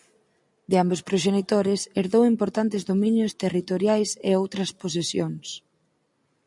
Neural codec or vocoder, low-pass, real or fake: none; 10.8 kHz; real